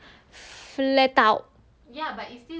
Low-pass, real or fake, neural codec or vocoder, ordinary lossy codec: none; real; none; none